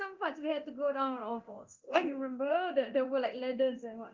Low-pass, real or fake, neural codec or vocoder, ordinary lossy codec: 7.2 kHz; fake; codec, 24 kHz, 0.9 kbps, DualCodec; Opus, 32 kbps